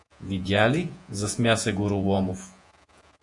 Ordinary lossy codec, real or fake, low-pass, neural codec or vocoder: AAC, 64 kbps; fake; 10.8 kHz; vocoder, 48 kHz, 128 mel bands, Vocos